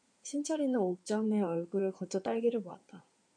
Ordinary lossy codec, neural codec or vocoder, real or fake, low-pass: MP3, 96 kbps; codec, 16 kHz in and 24 kHz out, 2.2 kbps, FireRedTTS-2 codec; fake; 9.9 kHz